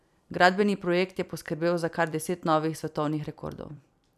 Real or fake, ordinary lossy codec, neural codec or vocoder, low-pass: fake; none; vocoder, 44.1 kHz, 128 mel bands every 256 samples, BigVGAN v2; 14.4 kHz